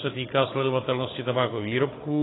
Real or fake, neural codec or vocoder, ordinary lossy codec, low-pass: fake; codec, 16 kHz, 16 kbps, FunCodec, trained on Chinese and English, 50 frames a second; AAC, 16 kbps; 7.2 kHz